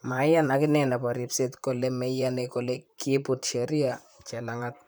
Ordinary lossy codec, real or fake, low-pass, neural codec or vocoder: none; fake; none; vocoder, 44.1 kHz, 128 mel bands, Pupu-Vocoder